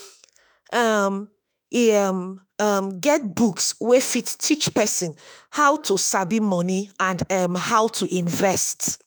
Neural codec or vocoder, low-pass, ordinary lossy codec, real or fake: autoencoder, 48 kHz, 32 numbers a frame, DAC-VAE, trained on Japanese speech; none; none; fake